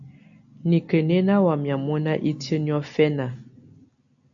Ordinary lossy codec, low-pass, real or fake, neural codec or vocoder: AAC, 64 kbps; 7.2 kHz; real; none